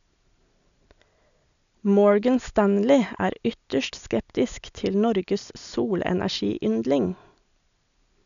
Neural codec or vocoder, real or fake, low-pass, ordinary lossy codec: none; real; 7.2 kHz; none